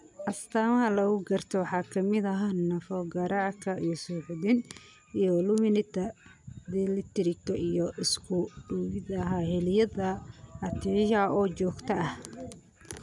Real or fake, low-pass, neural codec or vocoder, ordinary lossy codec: real; 10.8 kHz; none; none